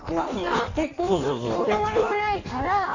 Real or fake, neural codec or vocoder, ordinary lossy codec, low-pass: fake; codec, 16 kHz in and 24 kHz out, 1.1 kbps, FireRedTTS-2 codec; none; 7.2 kHz